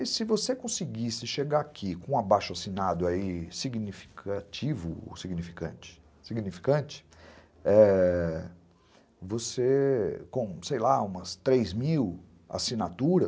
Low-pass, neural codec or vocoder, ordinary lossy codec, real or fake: none; none; none; real